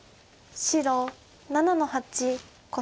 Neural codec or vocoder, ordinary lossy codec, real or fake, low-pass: none; none; real; none